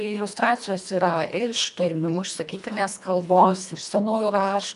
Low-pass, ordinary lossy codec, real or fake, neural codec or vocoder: 10.8 kHz; AAC, 96 kbps; fake; codec, 24 kHz, 1.5 kbps, HILCodec